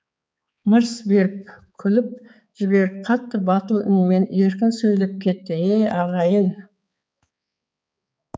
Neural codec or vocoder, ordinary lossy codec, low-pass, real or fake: codec, 16 kHz, 4 kbps, X-Codec, HuBERT features, trained on balanced general audio; none; none; fake